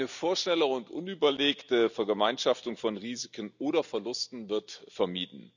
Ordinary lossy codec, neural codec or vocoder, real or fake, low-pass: none; none; real; 7.2 kHz